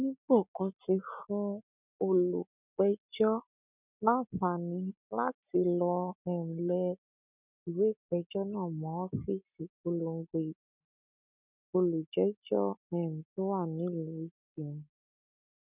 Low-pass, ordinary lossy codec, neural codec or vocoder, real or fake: 3.6 kHz; none; none; real